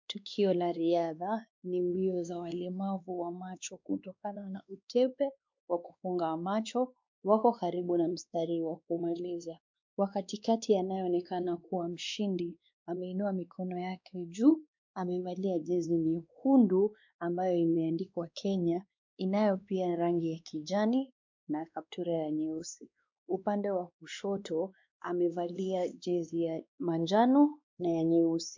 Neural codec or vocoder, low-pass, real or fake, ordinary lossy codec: codec, 16 kHz, 2 kbps, X-Codec, WavLM features, trained on Multilingual LibriSpeech; 7.2 kHz; fake; MP3, 64 kbps